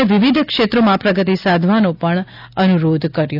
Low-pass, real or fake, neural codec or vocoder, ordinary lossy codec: 5.4 kHz; real; none; none